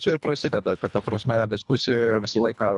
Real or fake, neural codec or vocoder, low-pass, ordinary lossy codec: fake; codec, 24 kHz, 1.5 kbps, HILCodec; 10.8 kHz; MP3, 96 kbps